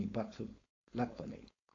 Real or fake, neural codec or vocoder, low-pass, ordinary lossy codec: fake; codec, 16 kHz, 4.8 kbps, FACodec; 7.2 kHz; AAC, 32 kbps